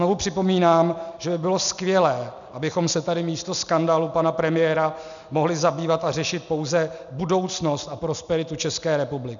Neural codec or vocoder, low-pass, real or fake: none; 7.2 kHz; real